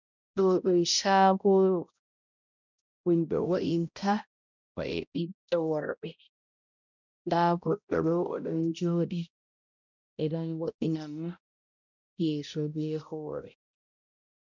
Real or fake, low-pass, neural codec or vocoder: fake; 7.2 kHz; codec, 16 kHz, 0.5 kbps, X-Codec, HuBERT features, trained on balanced general audio